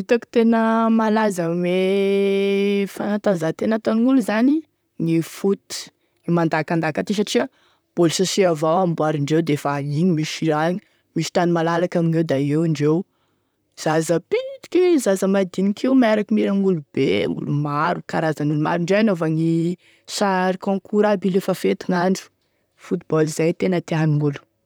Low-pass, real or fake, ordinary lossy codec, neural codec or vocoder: none; fake; none; vocoder, 44.1 kHz, 128 mel bands, Pupu-Vocoder